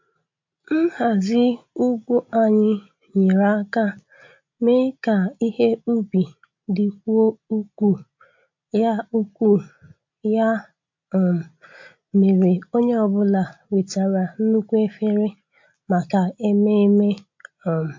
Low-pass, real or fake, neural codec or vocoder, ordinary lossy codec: 7.2 kHz; real; none; MP3, 48 kbps